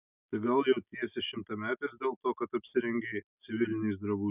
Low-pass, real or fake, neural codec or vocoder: 3.6 kHz; real; none